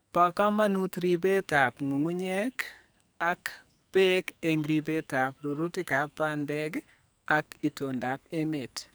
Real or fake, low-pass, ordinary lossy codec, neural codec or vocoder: fake; none; none; codec, 44.1 kHz, 2.6 kbps, SNAC